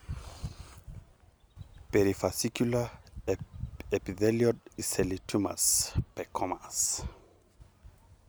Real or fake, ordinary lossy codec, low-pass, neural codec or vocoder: real; none; none; none